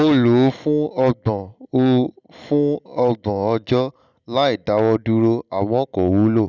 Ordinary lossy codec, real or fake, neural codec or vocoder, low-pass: none; real; none; 7.2 kHz